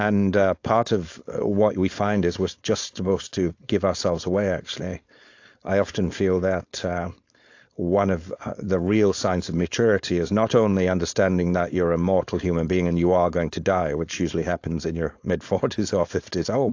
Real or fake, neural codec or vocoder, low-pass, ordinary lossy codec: fake; codec, 16 kHz, 4.8 kbps, FACodec; 7.2 kHz; AAC, 48 kbps